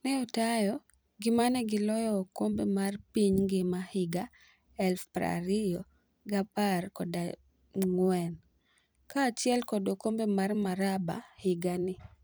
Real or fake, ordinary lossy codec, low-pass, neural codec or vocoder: fake; none; none; vocoder, 44.1 kHz, 128 mel bands every 256 samples, BigVGAN v2